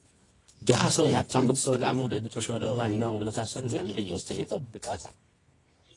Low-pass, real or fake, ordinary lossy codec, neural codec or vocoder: 10.8 kHz; fake; AAC, 32 kbps; codec, 24 kHz, 0.9 kbps, WavTokenizer, medium music audio release